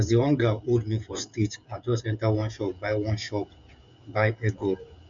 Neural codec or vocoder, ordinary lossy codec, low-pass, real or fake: codec, 16 kHz, 16 kbps, FreqCodec, smaller model; MP3, 64 kbps; 7.2 kHz; fake